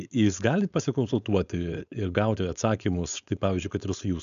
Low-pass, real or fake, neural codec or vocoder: 7.2 kHz; fake; codec, 16 kHz, 4.8 kbps, FACodec